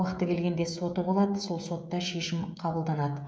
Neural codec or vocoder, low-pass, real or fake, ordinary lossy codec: codec, 16 kHz, 16 kbps, FreqCodec, smaller model; none; fake; none